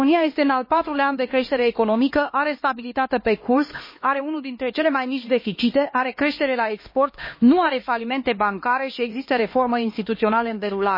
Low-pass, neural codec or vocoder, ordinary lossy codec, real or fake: 5.4 kHz; codec, 16 kHz, 2 kbps, X-Codec, HuBERT features, trained on LibriSpeech; MP3, 24 kbps; fake